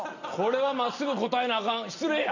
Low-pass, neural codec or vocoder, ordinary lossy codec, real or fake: 7.2 kHz; none; none; real